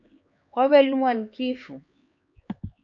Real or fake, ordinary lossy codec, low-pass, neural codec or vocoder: fake; AAC, 64 kbps; 7.2 kHz; codec, 16 kHz, 4 kbps, X-Codec, HuBERT features, trained on LibriSpeech